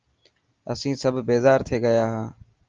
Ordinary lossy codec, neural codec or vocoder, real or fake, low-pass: Opus, 24 kbps; none; real; 7.2 kHz